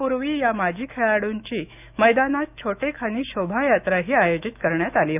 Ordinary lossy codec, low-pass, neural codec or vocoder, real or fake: none; 3.6 kHz; autoencoder, 48 kHz, 128 numbers a frame, DAC-VAE, trained on Japanese speech; fake